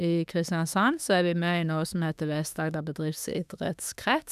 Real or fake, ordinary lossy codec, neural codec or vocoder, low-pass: fake; none; autoencoder, 48 kHz, 32 numbers a frame, DAC-VAE, trained on Japanese speech; 14.4 kHz